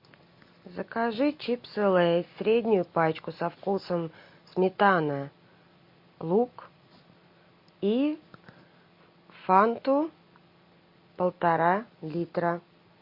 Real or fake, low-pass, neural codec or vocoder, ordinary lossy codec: real; 5.4 kHz; none; MP3, 32 kbps